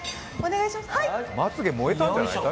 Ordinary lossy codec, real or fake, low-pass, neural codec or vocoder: none; real; none; none